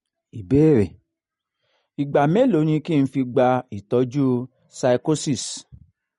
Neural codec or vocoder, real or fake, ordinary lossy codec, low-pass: none; real; MP3, 48 kbps; 19.8 kHz